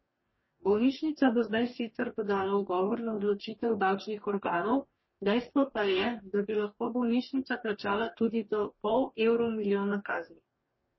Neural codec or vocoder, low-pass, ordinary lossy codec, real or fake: codec, 44.1 kHz, 2.6 kbps, DAC; 7.2 kHz; MP3, 24 kbps; fake